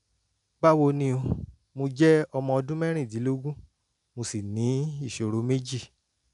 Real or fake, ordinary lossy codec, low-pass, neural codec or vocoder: real; none; 10.8 kHz; none